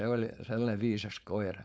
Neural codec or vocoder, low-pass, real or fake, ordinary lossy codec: codec, 16 kHz, 4.8 kbps, FACodec; none; fake; none